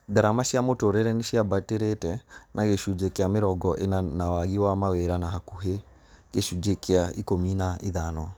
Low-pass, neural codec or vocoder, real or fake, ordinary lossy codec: none; codec, 44.1 kHz, 7.8 kbps, DAC; fake; none